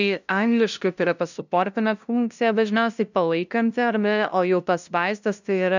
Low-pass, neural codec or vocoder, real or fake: 7.2 kHz; codec, 16 kHz, 0.5 kbps, FunCodec, trained on LibriTTS, 25 frames a second; fake